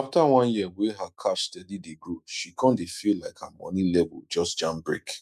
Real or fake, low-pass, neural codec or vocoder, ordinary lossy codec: fake; 14.4 kHz; autoencoder, 48 kHz, 128 numbers a frame, DAC-VAE, trained on Japanese speech; none